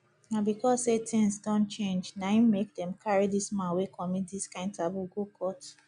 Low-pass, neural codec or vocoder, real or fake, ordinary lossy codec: 10.8 kHz; none; real; none